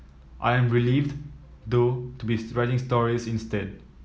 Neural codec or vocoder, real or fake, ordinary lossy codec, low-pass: none; real; none; none